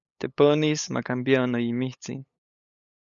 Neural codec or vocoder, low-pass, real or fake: codec, 16 kHz, 8 kbps, FunCodec, trained on LibriTTS, 25 frames a second; 7.2 kHz; fake